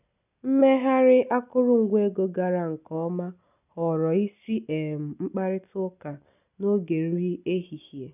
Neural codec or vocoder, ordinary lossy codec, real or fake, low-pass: none; none; real; 3.6 kHz